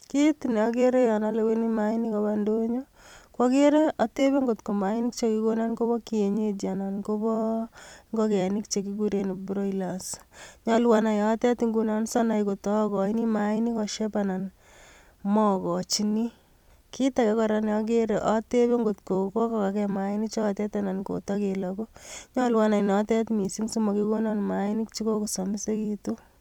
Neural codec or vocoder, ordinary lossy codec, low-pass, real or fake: vocoder, 44.1 kHz, 128 mel bands every 256 samples, BigVGAN v2; MP3, 96 kbps; 19.8 kHz; fake